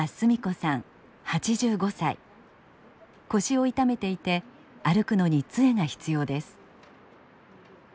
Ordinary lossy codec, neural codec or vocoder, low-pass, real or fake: none; none; none; real